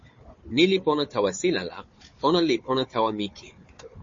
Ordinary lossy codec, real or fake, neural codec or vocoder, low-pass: MP3, 32 kbps; fake; codec, 16 kHz, 4 kbps, FunCodec, trained on Chinese and English, 50 frames a second; 7.2 kHz